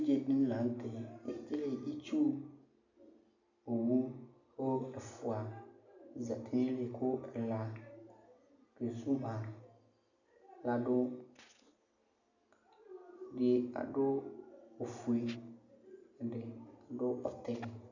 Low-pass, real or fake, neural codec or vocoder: 7.2 kHz; real; none